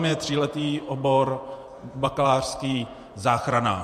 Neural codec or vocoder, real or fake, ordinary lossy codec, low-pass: vocoder, 48 kHz, 128 mel bands, Vocos; fake; MP3, 64 kbps; 14.4 kHz